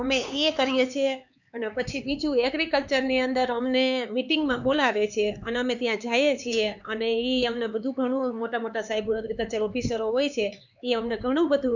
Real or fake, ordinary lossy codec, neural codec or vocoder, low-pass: fake; none; codec, 16 kHz, 4 kbps, X-Codec, HuBERT features, trained on LibriSpeech; 7.2 kHz